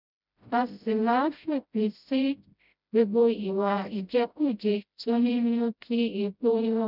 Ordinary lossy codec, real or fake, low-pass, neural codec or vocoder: none; fake; 5.4 kHz; codec, 16 kHz, 0.5 kbps, FreqCodec, smaller model